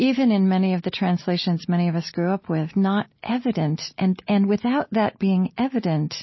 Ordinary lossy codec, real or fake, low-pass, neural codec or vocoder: MP3, 24 kbps; real; 7.2 kHz; none